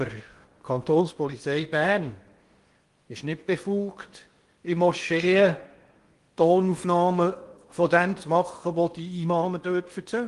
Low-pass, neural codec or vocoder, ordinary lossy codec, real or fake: 10.8 kHz; codec, 16 kHz in and 24 kHz out, 0.8 kbps, FocalCodec, streaming, 65536 codes; Opus, 24 kbps; fake